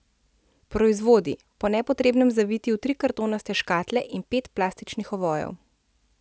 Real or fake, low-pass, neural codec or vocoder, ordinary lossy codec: real; none; none; none